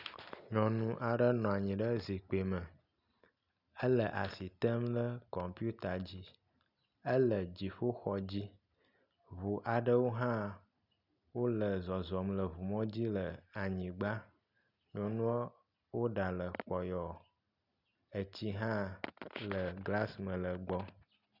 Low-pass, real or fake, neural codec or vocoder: 5.4 kHz; real; none